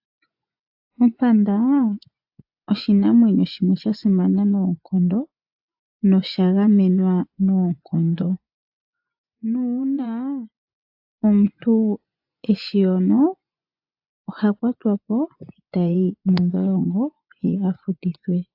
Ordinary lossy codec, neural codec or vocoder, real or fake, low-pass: AAC, 48 kbps; vocoder, 24 kHz, 100 mel bands, Vocos; fake; 5.4 kHz